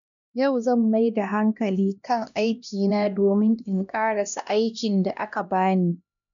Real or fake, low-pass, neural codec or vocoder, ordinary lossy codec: fake; 7.2 kHz; codec, 16 kHz, 1 kbps, X-Codec, HuBERT features, trained on LibriSpeech; none